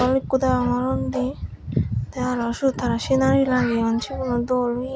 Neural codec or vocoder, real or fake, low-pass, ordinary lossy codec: none; real; none; none